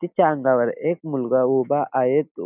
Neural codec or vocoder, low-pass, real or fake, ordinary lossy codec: none; 3.6 kHz; real; none